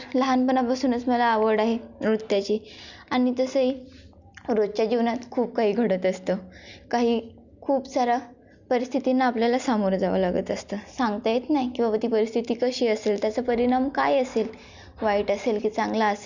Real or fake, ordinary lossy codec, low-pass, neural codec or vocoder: real; Opus, 64 kbps; 7.2 kHz; none